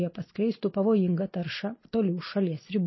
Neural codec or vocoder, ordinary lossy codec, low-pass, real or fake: none; MP3, 24 kbps; 7.2 kHz; real